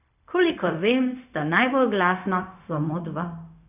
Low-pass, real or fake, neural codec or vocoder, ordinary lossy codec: 3.6 kHz; fake; codec, 16 kHz, 0.4 kbps, LongCat-Audio-Codec; none